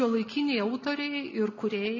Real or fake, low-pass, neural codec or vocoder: real; 7.2 kHz; none